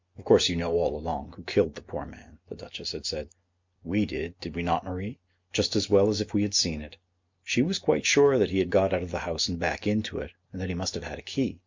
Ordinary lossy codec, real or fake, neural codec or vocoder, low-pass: MP3, 64 kbps; real; none; 7.2 kHz